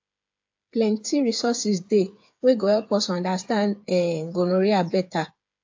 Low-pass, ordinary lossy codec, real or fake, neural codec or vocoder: 7.2 kHz; AAC, 48 kbps; fake; codec, 16 kHz, 8 kbps, FreqCodec, smaller model